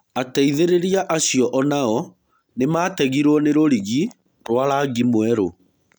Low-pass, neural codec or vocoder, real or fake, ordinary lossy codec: none; none; real; none